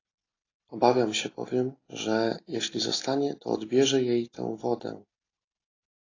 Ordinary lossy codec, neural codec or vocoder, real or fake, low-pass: AAC, 32 kbps; none; real; 7.2 kHz